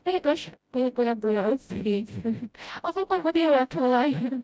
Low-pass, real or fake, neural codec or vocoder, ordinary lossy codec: none; fake; codec, 16 kHz, 0.5 kbps, FreqCodec, smaller model; none